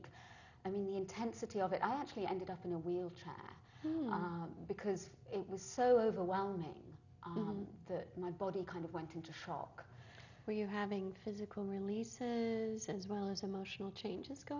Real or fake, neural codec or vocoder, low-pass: real; none; 7.2 kHz